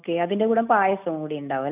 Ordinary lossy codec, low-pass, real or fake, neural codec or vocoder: none; 3.6 kHz; real; none